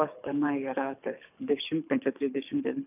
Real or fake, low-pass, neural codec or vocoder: fake; 3.6 kHz; codec, 24 kHz, 3 kbps, HILCodec